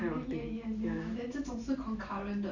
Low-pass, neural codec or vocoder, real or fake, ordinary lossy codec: 7.2 kHz; vocoder, 44.1 kHz, 128 mel bands every 512 samples, BigVGAN v2; fake; none